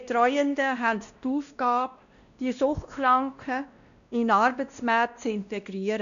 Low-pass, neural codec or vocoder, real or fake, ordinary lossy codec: 7.2 kHz; codec, 16 kHz, 1 kbps, X-Codec, WavLM features, trained on Multilingual LibriSpeech; fake; none